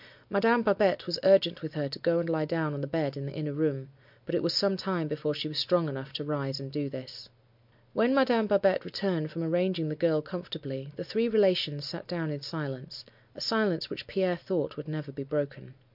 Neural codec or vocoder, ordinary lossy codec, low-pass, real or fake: none; MP3, 48 kbps; 5.4 kHz; real